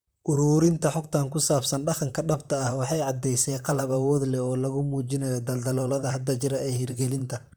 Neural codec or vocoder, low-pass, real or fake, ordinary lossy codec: vocoder, 44.1 kHz, 128 mel bands, Pupu-Vocoder; none; fake; none